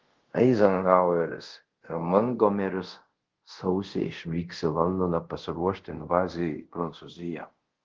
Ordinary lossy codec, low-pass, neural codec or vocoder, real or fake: Opus, 16 kbps; 7.2 kHz; codec, 24 kHz, 0.5 kbps, DualCodec; fake